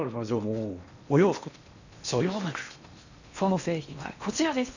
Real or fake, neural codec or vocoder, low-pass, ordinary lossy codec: fake; codec, 16 kHz in and 24 kHz out, 0.8 kbps, FocalCodec, streaming, 65536 codes; 7.2 kHz; none